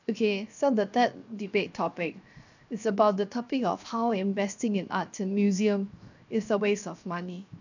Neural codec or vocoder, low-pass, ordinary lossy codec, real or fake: codec, 16 kHz, 0.7 kbps, FocalCodec; 7.2 kHz; none; fake